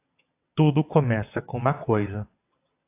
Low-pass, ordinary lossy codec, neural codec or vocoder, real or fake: 3.6 kHz; AAC, 24 kbps; vocoder, 22.05 kHz, 80 mel bands, WaveNeXt; fake